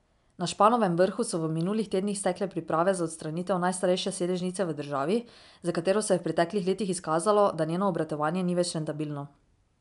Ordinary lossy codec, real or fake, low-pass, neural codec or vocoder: MP3, 96 kbps; real; 10.8 kHz; none